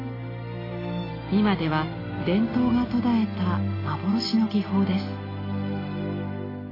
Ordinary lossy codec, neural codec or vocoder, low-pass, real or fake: AAC, 24 kbps; none; 5.4 kHz; real